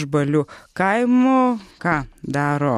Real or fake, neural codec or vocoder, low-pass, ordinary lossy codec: real; none; 19.8 kHz; MP3, 64 kbps